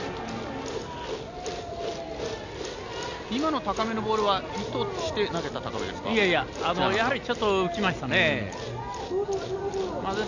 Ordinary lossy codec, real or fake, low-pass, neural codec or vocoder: none; real; 7.2 kHz; none